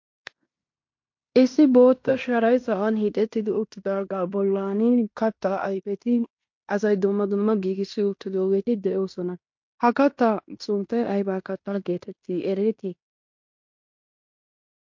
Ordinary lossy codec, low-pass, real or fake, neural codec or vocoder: MP3, 48 kbps; 7.2 kHz; fake; codec, 16 kHz in and 24 kHz out, 0.9 kbps, LongCat-Audio-Codec, fine tuned four codebook decoder